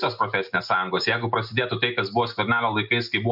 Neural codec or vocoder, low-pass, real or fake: none; 5.4 kHz; real